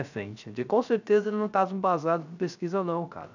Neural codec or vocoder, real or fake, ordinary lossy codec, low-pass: codec, 16 kHz, 0.3 kbps, FocalCodec; fake; none; 7.2 kHz